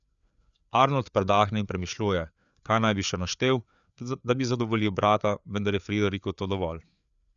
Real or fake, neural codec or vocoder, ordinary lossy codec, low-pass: fake; codec, 16 kHz, 4 kbps, FreqCodec, larger model; none; 7.2 kHz